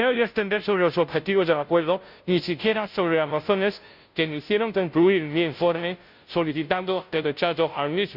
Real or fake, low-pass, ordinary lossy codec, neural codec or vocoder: fake; 5.4 kHz; none; codec, 16 kHz, 0.5 kbps, FunCodec, trained on Chinese and English, 25 frames a second